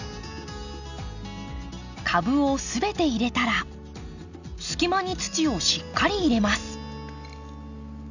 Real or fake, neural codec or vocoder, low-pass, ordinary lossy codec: real; none; 7.2 kHz; none